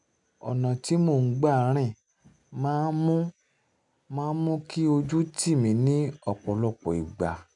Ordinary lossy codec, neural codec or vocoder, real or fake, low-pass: none; none; real; 10.8 kHz